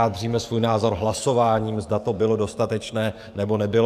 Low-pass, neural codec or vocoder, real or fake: 14.4 kHz; codec, 44.1 kHz, 7.8 kbps, DAC; fake